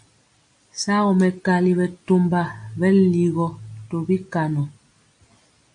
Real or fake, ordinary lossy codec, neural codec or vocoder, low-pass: real; MP3, 48 kbps; none; 9.9 kHz